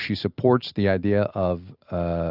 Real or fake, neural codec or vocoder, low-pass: real; none; 5.4 kHz